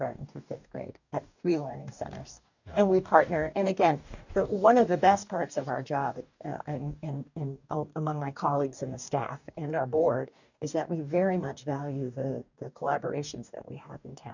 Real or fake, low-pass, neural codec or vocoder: fake; 7.2 kHz; codec, 44.1 kHz, 2.6 kbps, DAC